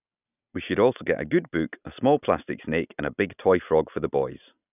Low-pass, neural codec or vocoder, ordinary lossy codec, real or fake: 3.6 kHz; none; none; real